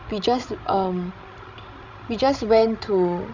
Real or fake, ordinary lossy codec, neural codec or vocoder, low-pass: fake; Opus, 64 kbps; codec, 16 kHz, 16 kbps, FreqCodec, larger model; 7.2 kHz